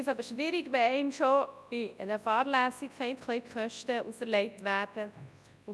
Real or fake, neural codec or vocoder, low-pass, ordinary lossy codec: fake; codec, 24 kHz, 0.9 kbps, WavTokenizer, large speech release; none; none